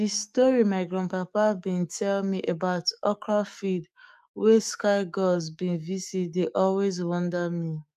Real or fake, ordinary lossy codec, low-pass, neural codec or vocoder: fake; none; 14.4 kHz; codec, 44.1 kHz, 7.8 kbps, DAC